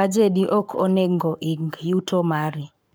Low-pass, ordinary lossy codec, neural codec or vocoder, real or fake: none; none; codec, 44.1 kHz, 7.8 kbps, Pupu-Codec; fake